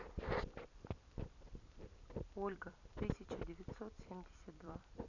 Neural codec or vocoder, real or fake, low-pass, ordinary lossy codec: vocoder, 44.1 kHz, 128 mel bands every 256 samples, BigVGAN v2; fake; 7.2 kHz; none